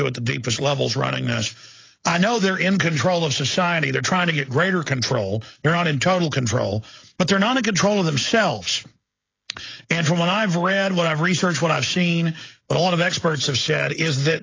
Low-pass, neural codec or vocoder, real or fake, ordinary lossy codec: 7.2 kHz; codec, 16 kHz, 16 kbps, FreqCodec, smaller model; fake; AAC, 32 kbps